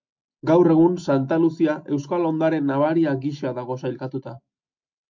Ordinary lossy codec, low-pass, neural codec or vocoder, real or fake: MP3, 48 kbps; 7.2 kHz; none; real